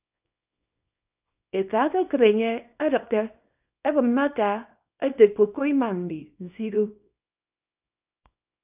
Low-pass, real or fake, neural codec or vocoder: 3.6 kHz; fake; codec, 24 kHz, 0.9 kbps, WavTokenizer, small release